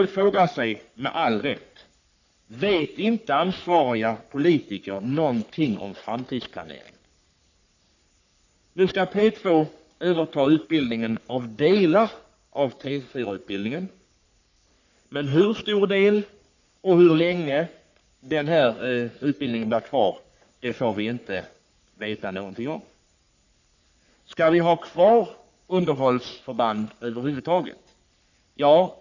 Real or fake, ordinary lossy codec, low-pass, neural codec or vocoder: fake; none; 7.2 kHz; codec, 44.1 kHz, 3.4 kbps, Pupu-Codec